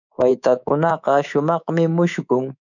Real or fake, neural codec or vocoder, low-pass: fake; codec, 24 kHz, 3.1 kbps, DualCodec; 7.2 kHz